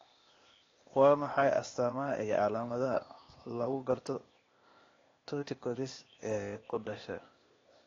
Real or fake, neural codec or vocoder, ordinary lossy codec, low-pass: fake; codec, 16 kHz, 0.8 kbps, ZipCodec; AAC, 32 kbps; 7.2 kHz